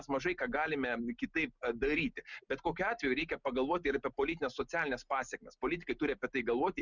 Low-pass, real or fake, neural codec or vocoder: 7.2 kHz; real; none